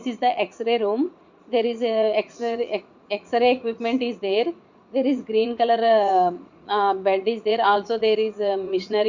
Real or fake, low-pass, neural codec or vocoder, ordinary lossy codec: fake; 7.2 kHz; vocoder, 44.1 kHz, 80 mel bands, Vocos; Opus, 64 kbps